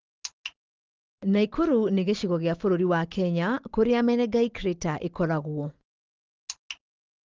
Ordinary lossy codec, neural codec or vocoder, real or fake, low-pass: Opus, 16 kbps; none; real; 7.2 kHz